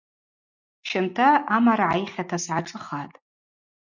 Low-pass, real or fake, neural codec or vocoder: 7.2 kHz; real; none